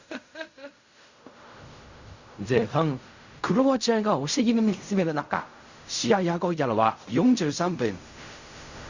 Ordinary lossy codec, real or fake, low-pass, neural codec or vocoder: Opus, 64 kbps; fake; 7.2 kHz; codec, 16 kHz in and 24 kHz out, 0.4 kbps, LongCat-Audio-Codec, fine tuned four codebook decoder